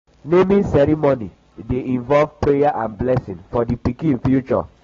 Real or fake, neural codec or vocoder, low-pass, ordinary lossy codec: real; none; 7.2 kHz; AAC, 24 kbps